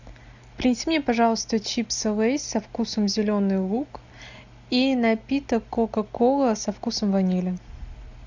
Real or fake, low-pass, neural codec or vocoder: real; 7.2 kHz; none